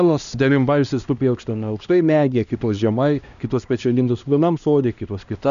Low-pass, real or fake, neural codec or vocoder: 7.2 kHz; fake; codec, 16 kHz, 1 kbps, X-Codec, HuBERT features, trained on LibriSpeech